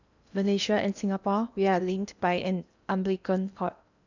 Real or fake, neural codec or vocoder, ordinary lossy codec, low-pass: fake; codec, 16 kHz in and 24 kHz out, 0.8 kbps, FocalCodec, streaming, 65536 codes; none; 7.2 kHz